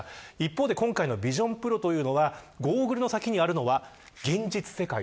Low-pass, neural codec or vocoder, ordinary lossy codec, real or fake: none; none; none; real